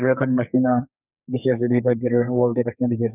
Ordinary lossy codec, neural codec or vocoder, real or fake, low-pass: none; codec, 32 kHz, 1.9 kbps, SNAC; fake; 3.6 kHz